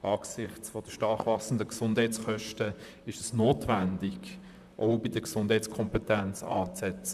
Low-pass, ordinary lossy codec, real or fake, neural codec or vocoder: 14.4 kHz; none; fake; vocoder, 44.1 kHz, 128 mel bands, Pupu-Vocoder